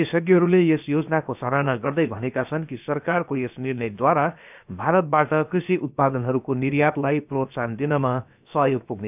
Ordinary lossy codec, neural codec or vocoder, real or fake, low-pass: none; codec, 16 kHz, about 1 kbps, DyCAST, with the encoder's durations; fake; 3.6 kHz